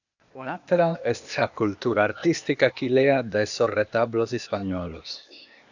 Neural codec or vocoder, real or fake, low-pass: codec, 16 kHz, 0.8 kbps, ZipCodec; fake; 7.2 kHz